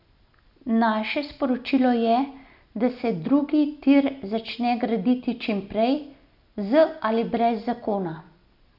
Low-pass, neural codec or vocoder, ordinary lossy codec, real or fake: 5.4 kHz; none; none; real